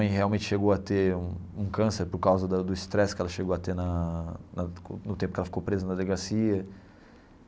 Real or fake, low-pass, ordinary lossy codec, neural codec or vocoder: real; none; none; none